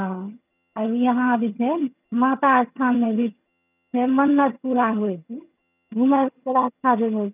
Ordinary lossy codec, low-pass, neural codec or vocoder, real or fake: none; 3.6 kHz; vocoder, 22.05 kHz, 80 mel bands, HiFi-GAN; fake